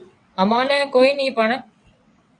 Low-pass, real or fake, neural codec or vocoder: 9.9 kHz; fake; vocoder, 22.05 kHz, 80 mel bands, WaveNeXt